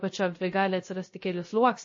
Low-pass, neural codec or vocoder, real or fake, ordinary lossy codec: 7.2 kHz; codec, 16 kHz, 0.3 kbps, FocalCodec; fake; MP3, 32 kbps